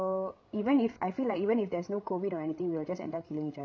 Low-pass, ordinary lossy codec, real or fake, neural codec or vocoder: none; none; fake; codec, 16 kHz, 16 kbps, FreqCodec, larger model